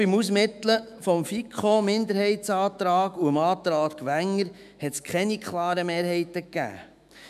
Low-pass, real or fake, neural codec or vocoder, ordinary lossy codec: 14.4 kHz; fake; autoencoder, 48 kHz, 128 numbers a frame, DAC-VAE, trained on Japanese speech; none